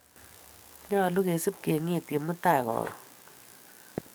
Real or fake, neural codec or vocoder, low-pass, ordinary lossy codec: fake; codec, 44.1 kHz, 7.8 kbps, DAC; none; none